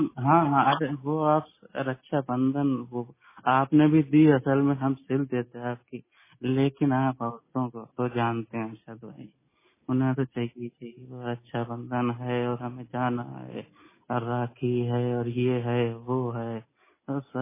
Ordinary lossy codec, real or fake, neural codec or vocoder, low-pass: MP3, 16 kbps; real; none; 3.6 kHz